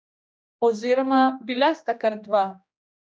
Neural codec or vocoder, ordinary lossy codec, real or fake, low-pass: codec, 16 kHz, 1 kbps, X-Codec, HuBERT features, trained on general audio; none; fake; none